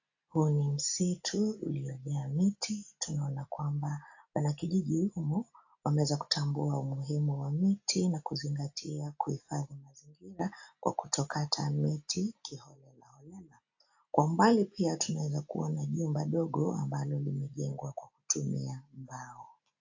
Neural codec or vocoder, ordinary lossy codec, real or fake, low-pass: none; AAC, 48 kbps; real; 7.2 kHz